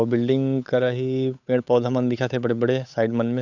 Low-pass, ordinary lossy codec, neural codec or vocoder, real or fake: 7.2 kHz; none; codec, 16 kHz, 8 kbps, FunCodec, trained on Chinese and English, 25 frames a second; fake